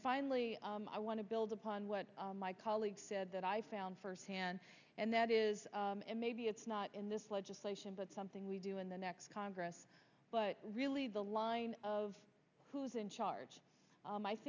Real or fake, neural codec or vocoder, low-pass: real; none; 7.2 kHz